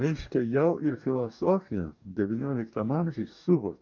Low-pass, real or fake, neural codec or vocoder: 7.2 kHz; fake; codec, 44.1 kHz, 2.6 kbps, DAC